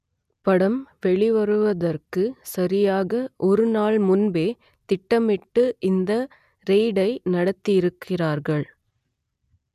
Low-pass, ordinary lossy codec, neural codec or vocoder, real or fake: 14.4 kHz; none; none; real